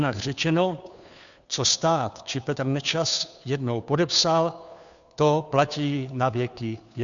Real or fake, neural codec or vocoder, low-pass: fake; codec, 16 kHz, 2 kbps, FunCodec, trained on Chinese and English, 25 frames a second; 7.2 kHz